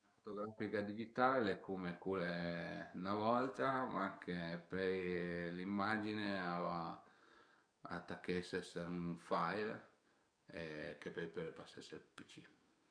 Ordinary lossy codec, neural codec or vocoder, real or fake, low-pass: none; codec, 44.1 kHz, 7.8 kbps, DAC; fake; 9.9 kHz